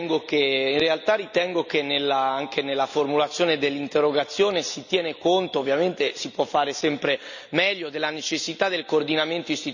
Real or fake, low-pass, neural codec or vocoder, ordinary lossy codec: real; 7.2 kHz; none; none